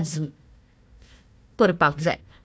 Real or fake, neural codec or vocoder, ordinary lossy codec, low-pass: fake; codec, 16 kHz, 1 kbps, FunCodec, trained on Chinese and English, 50 frames a second; none; none